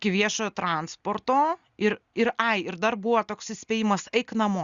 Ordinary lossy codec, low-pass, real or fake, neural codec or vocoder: Opus, 64 kbps; 7.2 kHz; real; none